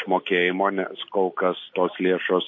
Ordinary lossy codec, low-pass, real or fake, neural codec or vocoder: MP3, 32 kbps; 7.2 kHz; real; none